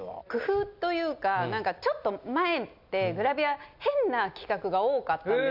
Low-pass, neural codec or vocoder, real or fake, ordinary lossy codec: 5.4 kHz; none; real; none